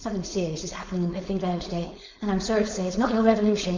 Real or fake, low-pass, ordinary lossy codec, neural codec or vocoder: fake; 7.2 kHz; MP3, 64 kbps; codec, 16 kHz, 4.8 kbps, FACodec